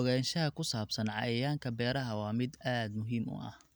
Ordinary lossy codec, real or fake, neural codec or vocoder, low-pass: none; real; none; none